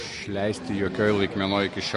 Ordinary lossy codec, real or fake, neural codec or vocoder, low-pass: MP3, 48 kbps; real; none; 14.4 kHz